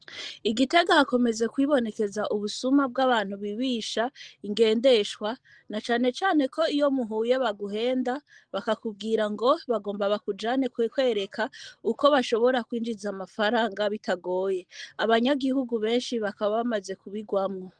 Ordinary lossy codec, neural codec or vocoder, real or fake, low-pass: Opus, 24 kbps; none; real; 9.9 kHz